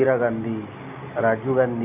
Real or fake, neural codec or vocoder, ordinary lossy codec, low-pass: real; none; AAC, 32 kbps; 3.6 kHz